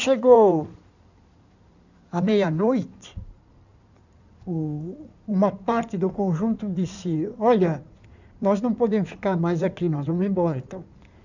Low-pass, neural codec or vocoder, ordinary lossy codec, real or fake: 7.2 kHz; codec, 16 kHz in and 24 kHz out, 2.2 kbps, FireRedTTS-2 codec; none; fake